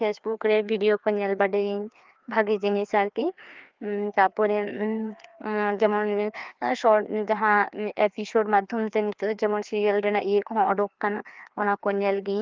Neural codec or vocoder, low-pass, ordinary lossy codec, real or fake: codec, 16 kHz, 2 kbps, FreqCodec, larger model; 7.2 kHz; Opus, 32 kbps; fake